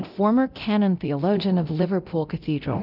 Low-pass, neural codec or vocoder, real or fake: 5.4 kHz; codec, 24 kHz, 0.9 kbps, DualCodec; fake